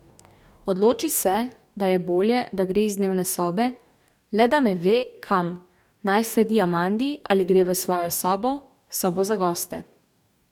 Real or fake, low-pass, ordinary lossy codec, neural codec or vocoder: fake; 19.8 kHz; none; codec, 44.1 kHz, 2.6 kbps, DAC